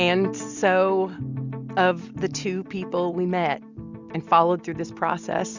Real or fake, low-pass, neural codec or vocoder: real; 7.2 kHz; none